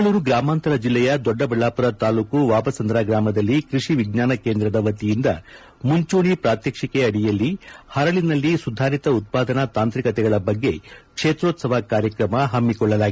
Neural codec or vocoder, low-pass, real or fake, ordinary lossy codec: none; none; real; none